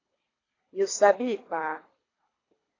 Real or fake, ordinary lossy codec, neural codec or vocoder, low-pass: fake; AAC, 32 kbps; codec, 24 kHz, 3 kbps, HILCodec; 7.2 kHz